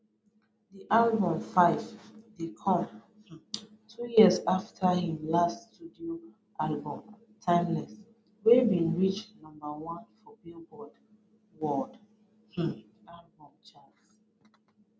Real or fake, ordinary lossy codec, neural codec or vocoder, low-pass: real; none; none; none